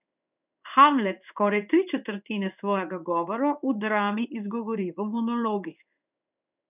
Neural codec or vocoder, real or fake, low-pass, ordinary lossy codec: codec, 16 kHz in and 24 kHz out, 1 kbps, XY-Tokenizer; fake; 3.6 kHz; none